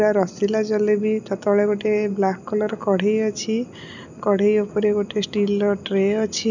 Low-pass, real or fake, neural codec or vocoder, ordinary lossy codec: 7.2 kHz; real; none; none